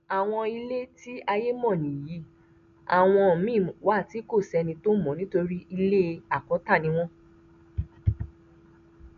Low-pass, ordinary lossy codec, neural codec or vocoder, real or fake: 7.2 kHz; none; none; real